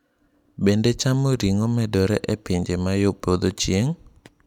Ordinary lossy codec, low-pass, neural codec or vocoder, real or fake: none; 19.8 kHz; none; real